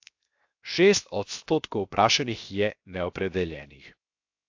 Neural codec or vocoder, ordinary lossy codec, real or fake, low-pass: codec, 16 kHz, 0.7 kbps, FocalCodec; AAC, 48 kbps; fake; 7.2 kHz